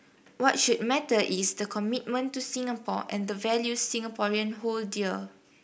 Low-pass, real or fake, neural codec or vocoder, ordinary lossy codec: none; real; none; none